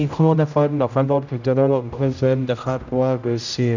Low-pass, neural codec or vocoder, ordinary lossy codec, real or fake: 7.2 kHz; codec, 16 kHz, 0.5 kbps, X-Codec, HuBERT features, trained on general audio; none; fake